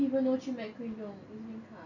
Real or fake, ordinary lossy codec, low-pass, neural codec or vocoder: real; none; 7.2 kHz; none